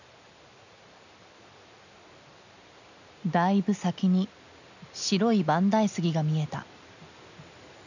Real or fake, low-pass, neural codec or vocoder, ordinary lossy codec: real; 7.2 kHz; none; none